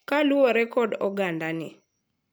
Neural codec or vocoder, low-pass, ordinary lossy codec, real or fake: none; none; none; real